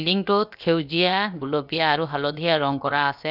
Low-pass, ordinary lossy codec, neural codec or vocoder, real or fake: 5.4 kHz; none; codec, 16 kHz, 0.7 kbps, FocalCodec; fake